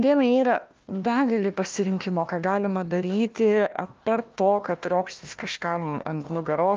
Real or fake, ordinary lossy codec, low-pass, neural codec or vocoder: fake; Opus, 24 kbps; 7.2 kHz; codec, 16 kHz, 1 kbps, FunCodec, trained on Chinese and English, 50 frames a second